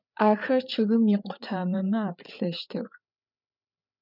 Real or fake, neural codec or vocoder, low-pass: fake; codec, 16 kHz, 8 kbps, FreqCodec, larger model; 5.4 kHz